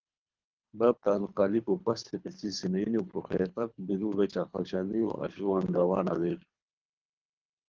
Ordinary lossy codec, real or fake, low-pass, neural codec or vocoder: Opus, 32 kbps; fake; 7.2 kHz; codec, 24 kHz, 3 kbps, HILCodec